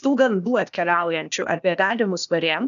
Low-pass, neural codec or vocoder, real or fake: 7.2 kHz; codec, 16 kHz, 0.8 kbps, ZipCodec; fake